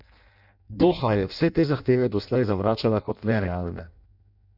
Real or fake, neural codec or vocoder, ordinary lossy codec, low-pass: fake; codec, 16 kHz in and 24 kHz out, 0.6 kbps, FireRedTTS-2 codec; none; 5.4 kHz